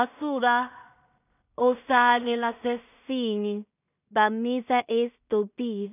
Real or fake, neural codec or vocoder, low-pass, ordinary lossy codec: fake; codec, 16 kHz in and 24 kHz out, 0.4 kbps, LongCat-Audio-Codec, two codebook decoder; 3.6 kHz; none